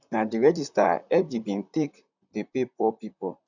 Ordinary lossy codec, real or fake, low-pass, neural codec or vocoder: none; fake; 7.2 kHz; vocoder, 44.1 kHz, 128 mel bands, Pupu-Vocoder